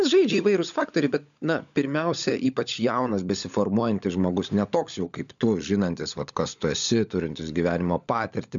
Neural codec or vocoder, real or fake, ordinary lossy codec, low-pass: codec, 16 kHz, 16 kbps, FunCodec, trained on LibriTTS, 50 frames a second; fake; MP3, 96 kbps; 7.2 kHz